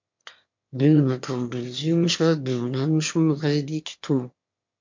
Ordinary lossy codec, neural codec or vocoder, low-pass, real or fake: MP3, 48 kbps; autoencoder, 22.05 kHz, a latent of 192 numbers a frame, VITS, trained on one speaker; 7.2 kHz; fake